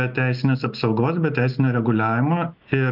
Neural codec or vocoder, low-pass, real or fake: none; 5.4 kHz; real